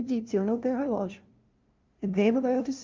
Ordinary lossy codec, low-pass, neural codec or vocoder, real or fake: Opus, 32 kbps; 7.2 kHz; codec, 16 kHz, 0.5 kbps, FunCodec, trained on LibriTTS, 25 frames a second; fake